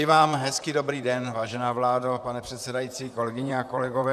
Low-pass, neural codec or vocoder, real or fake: 14.4 kHz; vocoder, 44.1 kHz, 128 mel bands, Pupu-Vocoder; fake